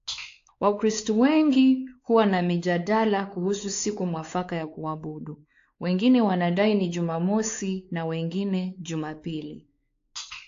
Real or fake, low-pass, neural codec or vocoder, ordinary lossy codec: fake; 7.2 kHz; codec, 16 kHz, 4 kbps, X-Codec, WavLM features, trained on Multilingual LibriSpeech; AAC, 48 kbps